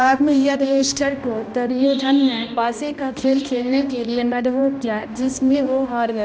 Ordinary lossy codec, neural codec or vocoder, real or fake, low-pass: none; codec, 16 kHz, 1 kbps, X-Codec, HuBERT features, trained on balanced general audio; fake; none